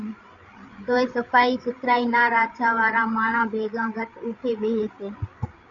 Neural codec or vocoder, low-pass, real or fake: codec, 16 kHz, 8 kbps, FreqCodec, larger model; 7.2 kHz; fake